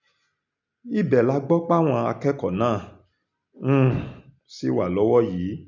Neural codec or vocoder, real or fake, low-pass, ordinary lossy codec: none; real; 7.2 kHz; none